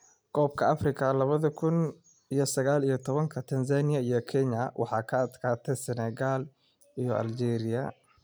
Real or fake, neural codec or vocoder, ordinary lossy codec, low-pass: real; none; none; none